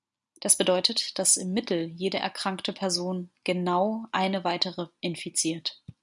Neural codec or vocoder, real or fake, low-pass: none; real; 10.8 kHz